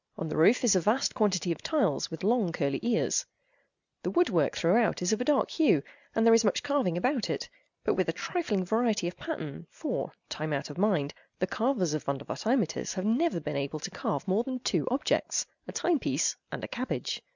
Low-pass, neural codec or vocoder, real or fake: 7.2 kHz; none; real